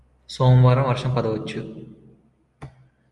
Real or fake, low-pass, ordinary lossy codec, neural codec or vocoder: real; 10.8 kHz; Opus, 32 kbps; none